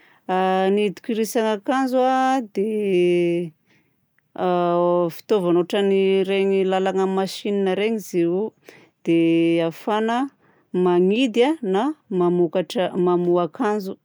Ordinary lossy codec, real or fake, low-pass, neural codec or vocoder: none; real; none; none